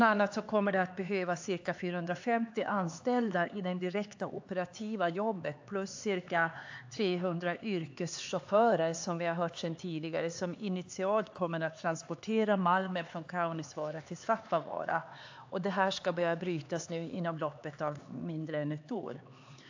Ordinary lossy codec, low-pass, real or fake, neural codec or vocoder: AAC, 48 kbps; 7.2 kHz; fake; codec, 16 kHz, 4 kbps, X-Codec, HuBERT features, trained on LibriSpeech